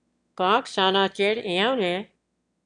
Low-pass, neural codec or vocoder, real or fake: 9.9 kHz; autoencoder, 22.05 kHz, a latent of 192 numbers a frame, VITS, trained on one speaker; fake